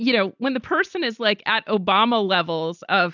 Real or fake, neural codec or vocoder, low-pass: real; none; 7.2 kHz